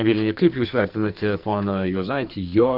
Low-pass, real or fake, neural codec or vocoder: 5.4 kHz; fake; codec, 44.1 kHz, 2.6 kbps, SNAC